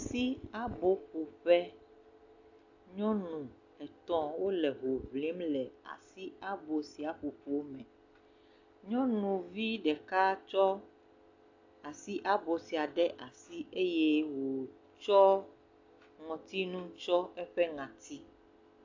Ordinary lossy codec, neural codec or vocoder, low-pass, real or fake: AAC, 48 kbps; none; 7.2 kHz; real